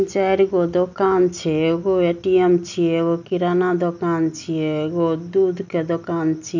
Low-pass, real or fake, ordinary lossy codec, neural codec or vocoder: 7.2 kHz; real; none; none